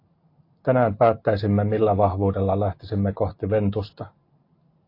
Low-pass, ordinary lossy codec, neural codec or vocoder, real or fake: 5.4 kHz; AAC, 32 kbps; none; real